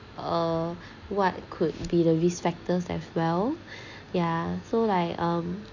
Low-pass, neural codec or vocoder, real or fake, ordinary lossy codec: 7.2 kHz; none; real; none